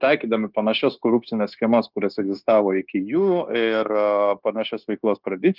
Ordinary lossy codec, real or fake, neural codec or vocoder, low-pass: Opus, 32 kbps; fake; codec, 16 kHz, 0.9 kbps, LongCat-Audio-Codec; 5.4 kHz